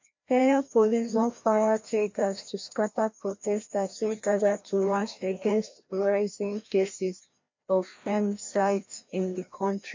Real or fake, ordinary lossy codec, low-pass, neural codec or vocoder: fake; MP3, 64 kbps; 7.2 kHz; codec, 16 kHz, 1 kbps, FreqCodec, larger model